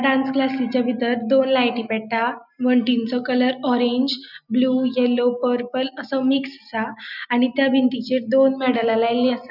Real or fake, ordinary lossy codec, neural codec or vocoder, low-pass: real; none; none; 5.4 kHz